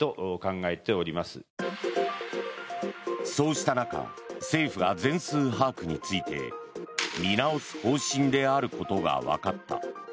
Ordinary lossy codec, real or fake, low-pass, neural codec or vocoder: none; real; none; none